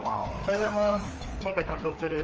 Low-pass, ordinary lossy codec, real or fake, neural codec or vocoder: 7.2 kHz; Opus, 24 kbps; fake; codec, 16 kHz, 4 kbps, FreqCodec, larger model